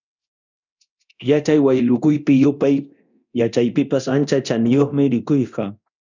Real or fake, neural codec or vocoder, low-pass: fake; codec, 24 kHz, 0.9 kbps, DualCodec; 7.2 kHz